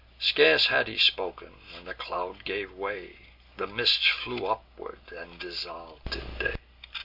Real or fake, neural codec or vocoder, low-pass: real; none; 5.4 kHz